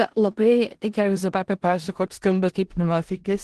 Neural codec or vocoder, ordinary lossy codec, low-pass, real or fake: codec, 16 kHz in and 24 kHz out, 0.4 kbps, LongCat-Audio-Codec, four codebook decoder; Opus, 16 kbps; 10.8 kHz; fake